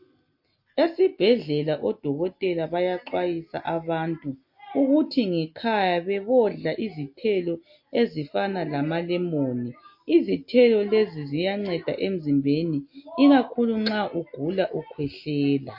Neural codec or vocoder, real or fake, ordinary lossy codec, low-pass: none; real; MP3, 32 kbps; 5.4 kHz